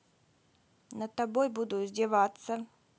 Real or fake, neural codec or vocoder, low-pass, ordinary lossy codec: real; none; none; none